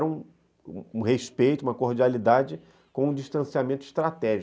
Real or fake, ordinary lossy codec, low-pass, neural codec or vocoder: real; none; none; none